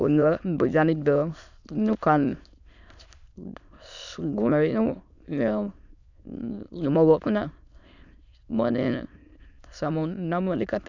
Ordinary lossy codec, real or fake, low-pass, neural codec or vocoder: none; fake; 7.2 kHz; autoencoder, 22.05 kHz, a latent of 192 numbers a frame, VITS, trained on many speakers